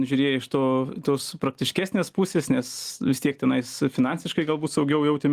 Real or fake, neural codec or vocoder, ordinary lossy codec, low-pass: real; none; Opus, 24 kbps; 14.4 kHz